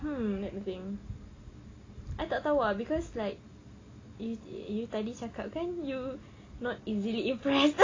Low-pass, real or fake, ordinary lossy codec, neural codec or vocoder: 7.2 kHz; real; AAC, 32 kbps; none